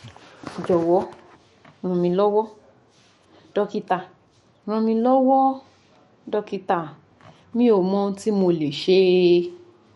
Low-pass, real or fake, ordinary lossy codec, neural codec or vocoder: 19.8 kHz; fake; MP3, 48 kbps; autoencoder, 48 kHz, 128 numbers a frame, DAC-VAE, trained on Japanese speech